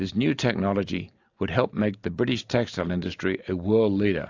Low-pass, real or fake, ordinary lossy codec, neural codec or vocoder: 7.2 kHz; real; AAC, 48 kbps; none